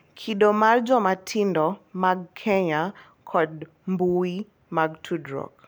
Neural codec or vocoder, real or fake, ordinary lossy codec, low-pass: none; real; none; none